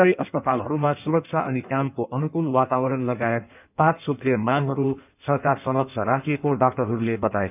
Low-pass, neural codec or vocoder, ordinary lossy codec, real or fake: 3.6 kHz; codec, 16 kHz in and 24 kHz out, 1.1 kbps, FireRedTTS-2 codec; none; fake